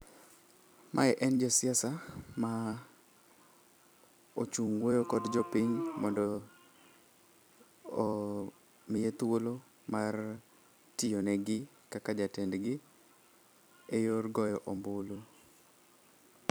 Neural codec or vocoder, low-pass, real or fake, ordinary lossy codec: vocoder, 44.1 kHz, 128 mel bands every 256 samples, BigVGAN v2; none; fake; none